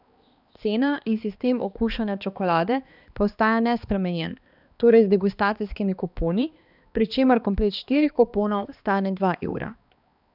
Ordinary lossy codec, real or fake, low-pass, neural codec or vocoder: none; fake; 5.4 kHz; codec, 16 kHz, 2 kbps, X-Codec, HuBERT features, trained on balanced general audio